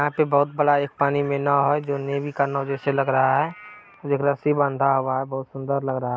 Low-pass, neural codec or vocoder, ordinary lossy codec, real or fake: none; none; none; real